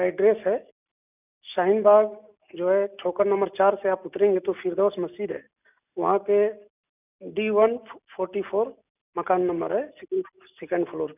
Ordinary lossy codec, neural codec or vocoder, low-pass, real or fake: none; none; 3.6 kHz; real